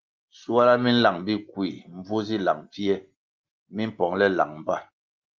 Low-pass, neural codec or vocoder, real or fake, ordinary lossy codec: 7.2 kHz; none; real; Opus, 32 kbps